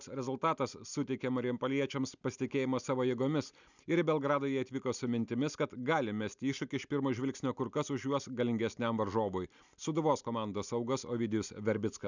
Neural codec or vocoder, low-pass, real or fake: none; 7.2 kHz; real